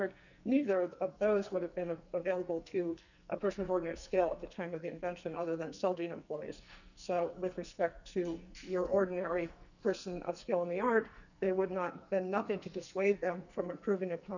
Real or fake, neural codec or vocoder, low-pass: fake; codec, 44.1 kHz, 2.6 kbps, SNAC; 7.2 kHz